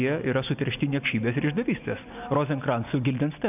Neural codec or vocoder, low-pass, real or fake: none; 3.6 kHz; real